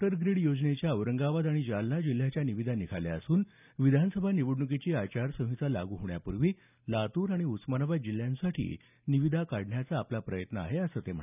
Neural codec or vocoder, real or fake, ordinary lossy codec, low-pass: none; real; none; 3.6 kHz